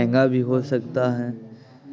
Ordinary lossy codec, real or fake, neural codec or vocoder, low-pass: none; real; none; none